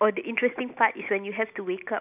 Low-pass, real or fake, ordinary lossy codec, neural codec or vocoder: 3.6 kHz; real; none; none